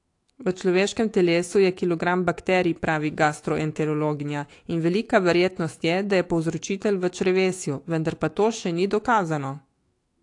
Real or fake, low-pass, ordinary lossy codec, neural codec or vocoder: fake; 10.8 kHz; AAC, 48 kbps; autoencoder, 48 kHz, 128 numbers a frame, DAC-VAE, trained on Japanese speech